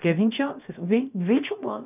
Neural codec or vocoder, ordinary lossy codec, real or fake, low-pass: codec, 16 kHz, about 1 kbps, DyCAST, with the encoder's durations; none; fake; 3.6 kHz